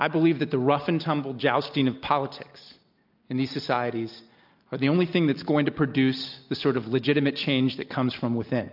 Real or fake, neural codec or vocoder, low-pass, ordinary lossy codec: real; none; 5.4 kHz; AAC, 48 kbps